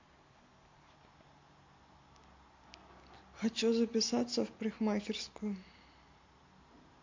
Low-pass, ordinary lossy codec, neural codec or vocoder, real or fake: 7.2 kHz; MP3, 48 kbps; none; real